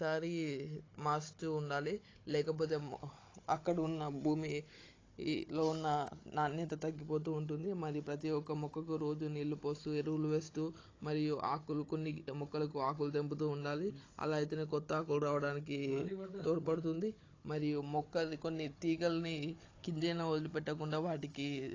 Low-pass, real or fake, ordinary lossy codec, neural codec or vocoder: 7.2 kHz; fake; AAC, 32 kbps; codec, 16 kHz, 16 kbps, FunCodec, trained on Chinese and English, 50 frames a second